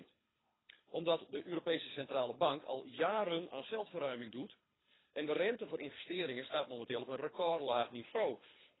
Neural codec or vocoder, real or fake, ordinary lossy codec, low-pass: codec, 24 kHz, 3 kbps, HILCodec; fake; AAC, 16 kbps; 7.2 kHz